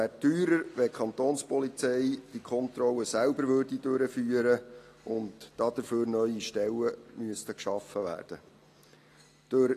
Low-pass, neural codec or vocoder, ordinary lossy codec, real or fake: 14.4 kHz; none; AAC, 48 kbps; real